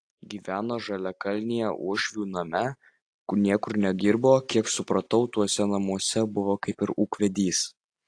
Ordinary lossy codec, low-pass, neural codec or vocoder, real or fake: AAC, 48 kbps; 9.9 kHz; none; real